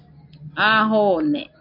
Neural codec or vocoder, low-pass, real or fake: none; 5.4 kHz; real